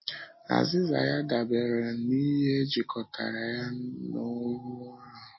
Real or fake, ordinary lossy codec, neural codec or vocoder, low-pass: real; MP3, 24 kbps; none; 7.2 kHz